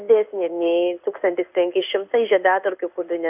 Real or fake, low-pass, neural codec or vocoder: fake; 3.6 kHz; codec, 16 kHz in and 24 kHz out, 1 kbps, XY-Tokenizer